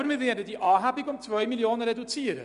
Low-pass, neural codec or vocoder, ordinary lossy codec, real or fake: 10.8 kHz; none; none; real